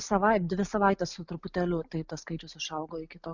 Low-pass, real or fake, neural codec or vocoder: 7.2 kHz; real; none